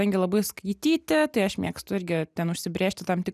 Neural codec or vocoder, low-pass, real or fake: none; 14.4 kHz; real